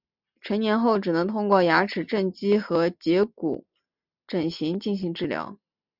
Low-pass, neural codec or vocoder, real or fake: 5.4 kHz; none; real